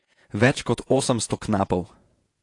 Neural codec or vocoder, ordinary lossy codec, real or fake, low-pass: vocoder, 48 kHz, 128 mel bands, Vocos; AAC, 48 kbps; fake; 10.8 kHz